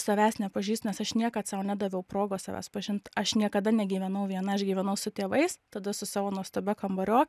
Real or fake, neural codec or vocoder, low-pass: real; none; 14.4 kHz